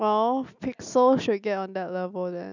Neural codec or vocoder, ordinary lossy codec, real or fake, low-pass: none; none; real; 7.2 kHz